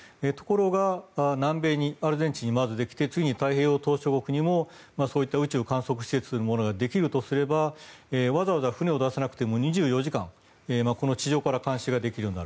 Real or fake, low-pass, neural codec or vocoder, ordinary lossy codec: real; none; none; none